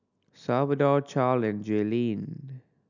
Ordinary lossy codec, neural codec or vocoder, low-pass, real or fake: none; none; 7.2 kHz; real